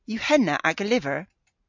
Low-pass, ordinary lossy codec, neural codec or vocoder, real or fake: 7.2 kHz; MP3, 64 kbps; none; real